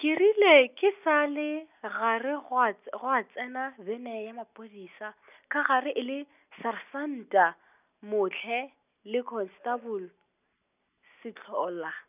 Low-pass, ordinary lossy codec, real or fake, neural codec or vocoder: 3.6 kHz; none; real; none